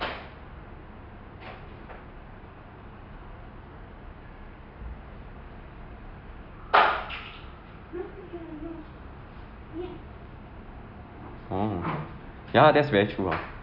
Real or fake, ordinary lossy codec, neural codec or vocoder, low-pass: real; none; none; 5.4 kHz